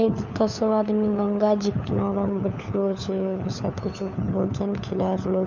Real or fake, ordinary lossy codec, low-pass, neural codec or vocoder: fake; none; 7.2 kHz; codec, 16 kHz, 4 kbps, FunCodec, trained on LibriTTS, 50 frames a second